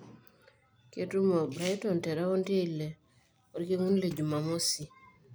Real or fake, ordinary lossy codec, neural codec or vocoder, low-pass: real; none; none; none